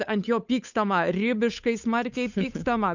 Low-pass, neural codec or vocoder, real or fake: 7.2 kHz; codec, 16 kHz, 8 kbps, FunCodec, trained on Chinese and English, 25 frames a second; fake